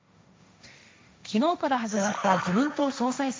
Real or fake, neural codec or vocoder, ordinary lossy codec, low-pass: fake; codec, 16 kHz, 1.1 kbps, Voila-Tokenizer; none; none